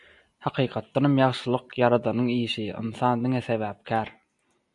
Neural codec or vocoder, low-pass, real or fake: none; 10.8 kHz; real